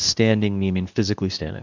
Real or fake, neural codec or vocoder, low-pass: fake; codec, 24 kHz, 0.9 kbps, WavTokenizer, medium speech release version 2; 7.2 kHz